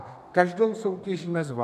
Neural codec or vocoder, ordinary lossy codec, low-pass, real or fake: codec, 32 kHz, 1.9 kbps, SNAC; AAC, 96 kbps; 14.4 kHz; fake